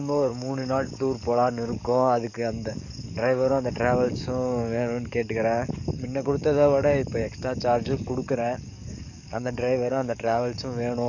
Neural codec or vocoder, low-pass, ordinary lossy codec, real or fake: codec, 44.1 kHz, 7.8 kbps, DAC; 7.2 kHz; none; fake